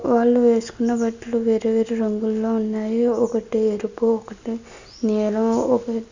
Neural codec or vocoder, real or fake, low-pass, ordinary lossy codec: none; real; 7.2 kHz; Opus, 64 kbps